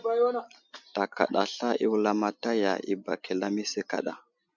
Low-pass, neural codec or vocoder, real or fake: 7.2 kHz; none; real